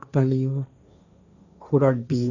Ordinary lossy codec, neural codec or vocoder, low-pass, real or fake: none; codec, 16 kHz, 1.1 kbps, Voila-Tokenizer; 7.2 kHz; fake